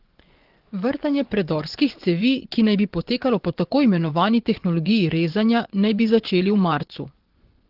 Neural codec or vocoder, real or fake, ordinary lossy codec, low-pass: vocoder, 24 kHz, 100 mel bands, Vocos; fake; Opus, 16 kbps; 5.4 kHz